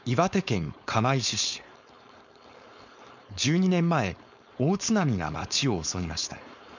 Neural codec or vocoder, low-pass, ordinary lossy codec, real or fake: codec, 16 kHz, 4.8 kbps, FACodec; 7.2 kHz; none; fake